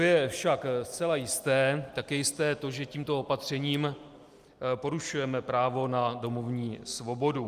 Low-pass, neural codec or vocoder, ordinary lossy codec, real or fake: 14.4 kHz; none; Opus, 32 kbps; real